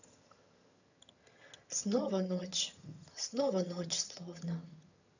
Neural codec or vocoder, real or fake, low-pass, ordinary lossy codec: vocoder, 22.05 kHz, 80 mel bands, HiFi-GAN; fake; 7.2 kHz; none